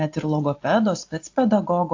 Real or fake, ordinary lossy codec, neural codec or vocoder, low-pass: real; AAC, 48 kbps; none; 7.2 kHz